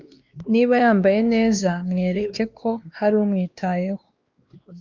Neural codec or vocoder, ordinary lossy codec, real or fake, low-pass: codec, 16 kHz, 2 kbps, X-Codec, WavLM features, trained on Multilingual LibriSpeech; Opus, 24 kbps; fake; 7.2 kHz